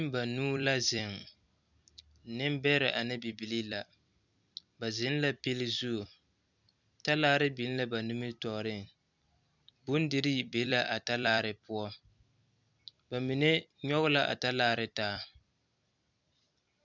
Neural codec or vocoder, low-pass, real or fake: vocoder, 44.1 kHz, 128 mel bands every 256 samples, BigVGAN v2; 7.2 kHz; fake